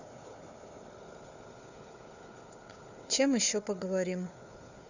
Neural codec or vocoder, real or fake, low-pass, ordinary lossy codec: codec, 16 kHz, 4 kbps, FunCodec, trained on Chinese and English, 50 frames a second; fake; 7.2 kHz; none